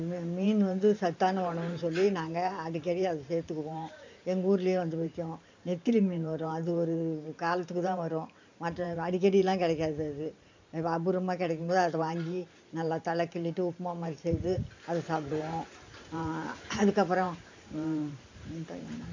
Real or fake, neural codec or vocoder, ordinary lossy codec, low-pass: fake; vocoder, 44.1 kHz, 128 mel bands every 512 samples, BigVGAN v2; MP3, 64 kbps; 7.2 kHz